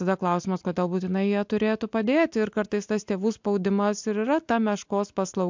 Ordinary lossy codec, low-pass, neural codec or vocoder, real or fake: MP3, 64 kbps; 7.2 kHz; none; real